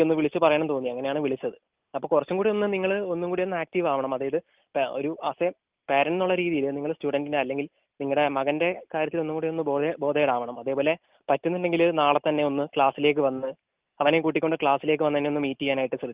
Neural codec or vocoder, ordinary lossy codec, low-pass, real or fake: none; Opus, 32 kbps; 3.6 kHz; real